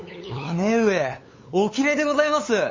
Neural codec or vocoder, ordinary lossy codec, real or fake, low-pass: codec, 16 kHz, 8 kbps, FunCodec, trained on LibriTTS, 25 frames a second; MP3, 32 kbps; fake; 7.2 kHz